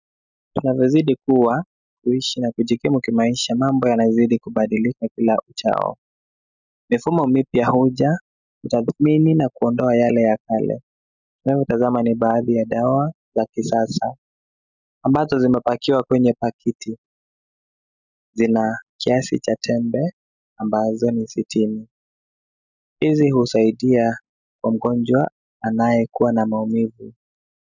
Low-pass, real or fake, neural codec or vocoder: 7.2 kHz; real; none